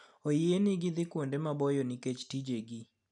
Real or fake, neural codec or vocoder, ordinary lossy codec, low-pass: real; none; none; 10.8 kHz